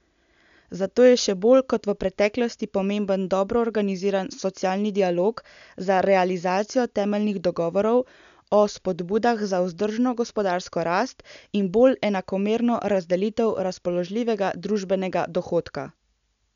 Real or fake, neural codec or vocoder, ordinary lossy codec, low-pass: real; none; none; 7.2 kHz